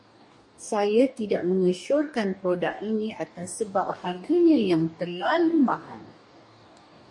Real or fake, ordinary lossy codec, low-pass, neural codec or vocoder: fake; MP3, 48 kbps; 10.8 kHz; codec, 44.1 kHz, 2.6 kbps, DAC